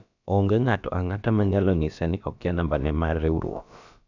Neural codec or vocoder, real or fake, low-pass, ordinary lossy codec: codec, 16 kHz, about 1 kbps, DyCAST, with the encoder's durations; fake; 7.2 kHz; none